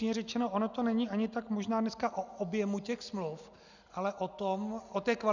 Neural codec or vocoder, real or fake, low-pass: none; real; 7.2 kHz